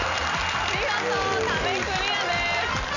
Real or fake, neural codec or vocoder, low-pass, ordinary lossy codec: real; none; 7.2 kHz; none